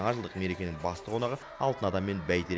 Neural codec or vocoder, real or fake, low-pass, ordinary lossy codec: none; real; none; none